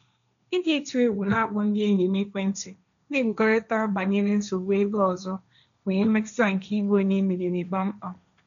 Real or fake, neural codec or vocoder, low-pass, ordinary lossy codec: fake; codec, 16 kHz, 1.1 kbps, Voila-Tokenizer; 7.2 kHz; none